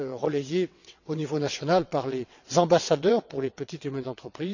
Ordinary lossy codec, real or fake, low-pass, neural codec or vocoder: none; fake; 7.2 kHz; vocoder, 22.05 kHz, 80 mel bands, WaveNeXt